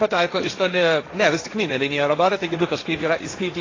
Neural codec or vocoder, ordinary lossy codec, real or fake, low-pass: codec, 16 kHz, 1.1 kbps, Voila-Tokenizer; AAC, 32 kbps; fake; 7.2 kHz